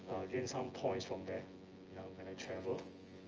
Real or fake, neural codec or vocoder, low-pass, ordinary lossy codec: fake; vocoder, 24 kHz, 100 mel bands, Vocos; 7.2 kHz; Opus, 24 kbps